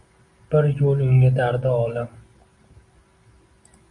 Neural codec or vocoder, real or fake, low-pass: none; real; 10.8 kHz